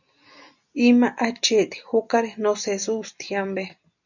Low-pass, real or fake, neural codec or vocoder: 7.2 kHz; real; none